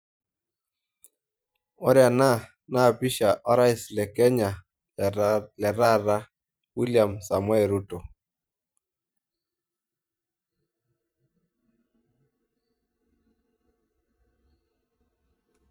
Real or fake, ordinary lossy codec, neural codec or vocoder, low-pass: fake; none; vocoder, 44.1 kHz, 128 mel bands every 512 samples, BigVGAN v2; none